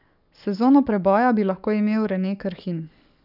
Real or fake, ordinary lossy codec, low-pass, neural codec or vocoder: fake; none; 5.4 kHz; codec, 44.1 kHz, 7.8 kbps, DAC